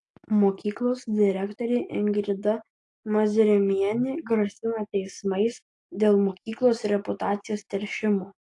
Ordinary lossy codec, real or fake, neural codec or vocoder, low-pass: AAC, 48 kbps; real; none; 10.8 kHz